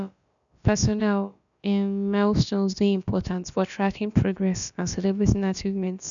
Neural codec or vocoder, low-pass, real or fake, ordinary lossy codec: codec, 16 kHz, about 1 kbps, DyCAST, with the encoder's durations; 7.2 kHz; fake; none